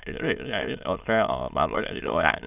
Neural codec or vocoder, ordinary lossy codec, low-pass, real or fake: autoencoder, 22.05 kHz, a latent of 192 numbers a frame, VITS, trained on many speakers; none; 3.6 kHz; fake